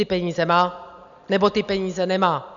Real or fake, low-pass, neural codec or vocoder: real; 7.2 kHz; none